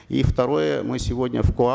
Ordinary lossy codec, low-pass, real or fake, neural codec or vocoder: none; none; real; none